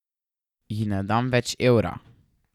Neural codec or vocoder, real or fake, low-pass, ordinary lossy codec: none; real; 19.8 kHz; none